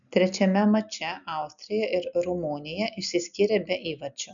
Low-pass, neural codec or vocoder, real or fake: 7.2 kHz; none; real